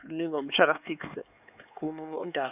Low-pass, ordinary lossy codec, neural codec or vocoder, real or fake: 3.6 kHz; none; codec, 16 kHz, 4 kbps, X-Codec, HuBERT features, trained on LibriSpeech; fake